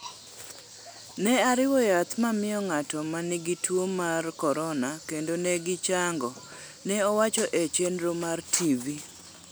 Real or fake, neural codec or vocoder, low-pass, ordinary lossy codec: real; none; none; none